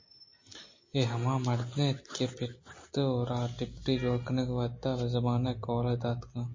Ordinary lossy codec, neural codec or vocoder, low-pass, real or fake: MP3, 32 kbps; none; 7.2 kHz; real